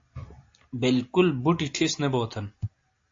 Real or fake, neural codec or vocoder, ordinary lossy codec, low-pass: real; none; AAC, 48 kbps; 7.2 kHz